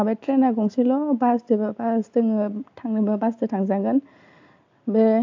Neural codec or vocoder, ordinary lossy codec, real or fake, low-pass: none; AAC, 48 kbps; real; 7.2 kHz